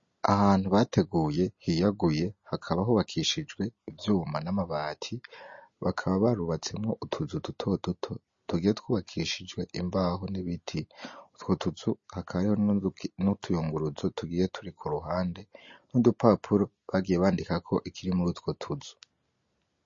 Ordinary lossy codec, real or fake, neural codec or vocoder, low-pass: MP3, 32 kbps; real; none; 7.2 kHz